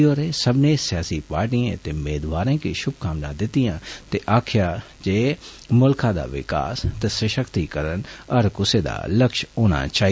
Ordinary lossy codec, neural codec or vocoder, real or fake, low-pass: none; none; real; none